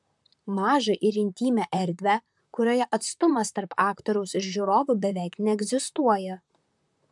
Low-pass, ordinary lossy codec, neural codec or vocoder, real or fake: 10.8 kHz; MP3, 96 kbps; vocoder, 44.1 kHz, 128 mel bands, Pupu-Vocoder; fake